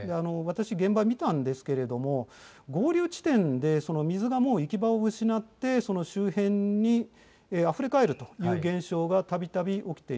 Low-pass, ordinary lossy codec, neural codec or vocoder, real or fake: none; none; none; real